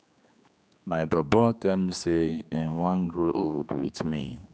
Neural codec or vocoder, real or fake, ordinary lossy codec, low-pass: codec, 16 kHz, 2 kbps, X-Codec, HuBERT features, trained on general audio; fake; none; none